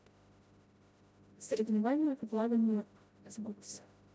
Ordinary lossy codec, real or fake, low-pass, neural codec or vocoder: none; fake; none; codec, 16 kHz, 0.5 kbps, FreqCodec, smaller model